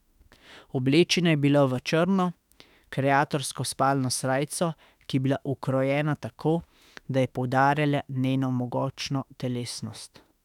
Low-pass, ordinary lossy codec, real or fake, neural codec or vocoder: 19.8 kHz; none; fake; autoencoder, 48 kHz, 32 numbers a frame, DAC-VAE, trained on Japanese speech